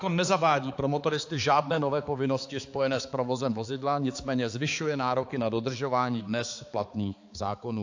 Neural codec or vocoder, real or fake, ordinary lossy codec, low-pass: codec, 16 kHz, 4 kbps, X-Codec, HuBERT features, trained on balanced general audio; fake; AAC, 48 kbps; 7.2 kHz